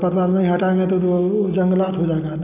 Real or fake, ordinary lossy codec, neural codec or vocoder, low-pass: real; none; none; 3.6 kHz